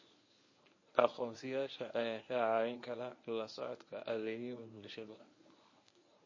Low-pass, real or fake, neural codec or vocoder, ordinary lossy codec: 7.2 kHz; fake; codec, 24 kHz, 0.9 kbps, WavTokenizer, medium speech release version 1; MP3, 32 kbps